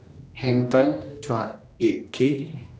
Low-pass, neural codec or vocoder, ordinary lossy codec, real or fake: none; codec, 16 kHz, 1 kbps, X-Codec, HuBERT features, trained on general audio; none; fake